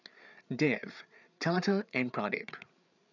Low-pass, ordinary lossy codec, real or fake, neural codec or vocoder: 7.2 kHz; none; fake; codec, 16 kHz, 8 kbps, FreqCodec, larger model